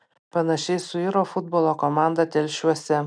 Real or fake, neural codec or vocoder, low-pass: real; none; 9.9 kHz